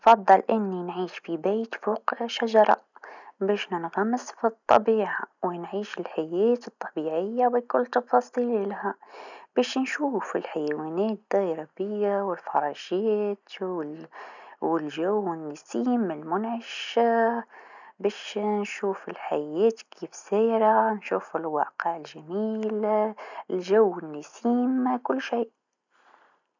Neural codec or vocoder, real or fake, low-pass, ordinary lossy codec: none; real; 7.2 kHz; none